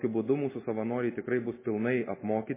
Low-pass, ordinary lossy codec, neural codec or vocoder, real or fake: 3.6 kHz; MP3, 16 kbps; none; real